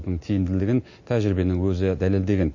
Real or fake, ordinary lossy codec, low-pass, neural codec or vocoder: real; MP3, 32 kbps; 7.2 kHz; none